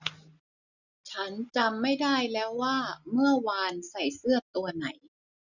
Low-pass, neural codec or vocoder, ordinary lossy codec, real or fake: 7.2 kHz; none; none; real